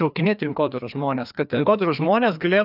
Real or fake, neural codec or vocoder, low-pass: fake; codec, 16 kHz, 2 kbps, FreqCodec, larger model; 5.4 kHz